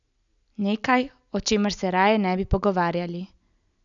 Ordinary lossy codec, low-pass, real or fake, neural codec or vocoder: none; 7.2 kHz; real; none